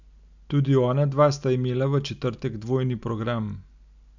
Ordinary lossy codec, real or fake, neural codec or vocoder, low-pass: none; real; none; 7.2 kHz